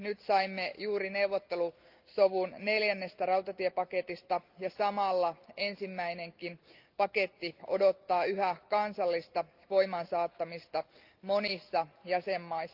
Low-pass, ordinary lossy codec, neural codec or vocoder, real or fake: 5.4 kHz; Opus, 24 kbps; none; real